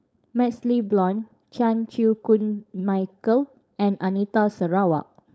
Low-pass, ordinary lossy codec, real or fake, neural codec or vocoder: none; none; fake; codec, 16 kHz, 4.8 kbps, FACodec